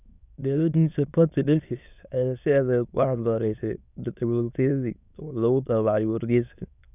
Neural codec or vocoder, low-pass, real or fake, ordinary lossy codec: autoencoder, 22.05 kHz, a latent of 192 numbers a frame, VITS, trained on many speakers; 3.6 kHz; fake; none